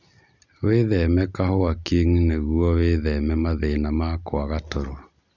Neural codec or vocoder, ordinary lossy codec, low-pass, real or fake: none; none; 7.2 kHz; real